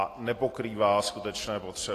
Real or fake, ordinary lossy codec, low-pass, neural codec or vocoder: real; AAC, 48 kbps; 14.4 kHz; none